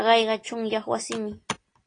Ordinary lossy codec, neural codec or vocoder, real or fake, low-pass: AAC, 32 kbps; none; real; 9.9 kHz